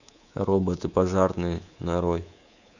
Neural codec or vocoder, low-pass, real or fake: codec, 24 kHz, 3.1 kbps, DualCodec; 7.2 kHz; fake